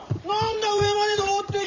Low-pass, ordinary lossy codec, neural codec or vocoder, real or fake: 7.2 kHz; none; vocoder, 44.1 kHz, 128 mel bands every 512 samples, BigVGAN v2; fake